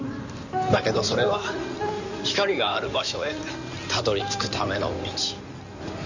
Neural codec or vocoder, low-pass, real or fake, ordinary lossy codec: codec, 16 kHz in and 24 kHz out, 2.2 kbps, FireRedTTS-2 codec; 7.2 kHz; fake; none